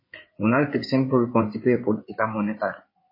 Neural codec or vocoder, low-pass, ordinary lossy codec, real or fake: codec, 16 kHz in and 24 kHz out, 2.2 kbps, FireRedTTS-2 codec; 5.4 kHz; MP3, 24 kbps; fake